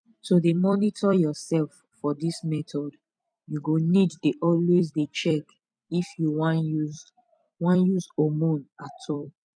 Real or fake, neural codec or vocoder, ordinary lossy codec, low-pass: fake; vocoder, 44.1 kHz, 128 mel bands every 256 samples, BigVGAN v2; none; 9.9 kHz